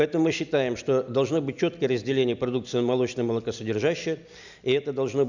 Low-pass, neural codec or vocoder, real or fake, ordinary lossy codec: 7.2 kHz; none; real; none